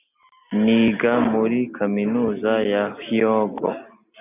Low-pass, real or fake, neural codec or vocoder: 3.6 kHz; real; none